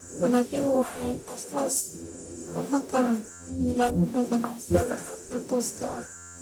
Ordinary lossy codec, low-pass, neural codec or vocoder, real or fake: none; none; codec, 44.1 kHz, 0.9 kbps, DAC; fake